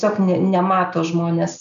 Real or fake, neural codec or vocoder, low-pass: real; none; 7.2 kHz